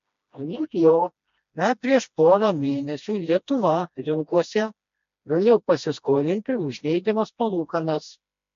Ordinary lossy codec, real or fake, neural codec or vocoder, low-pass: MP3, 48 kbps; fake; codec, 16 kHz, 1 kbps, FreqCodec, smaller model; 7.2 kHz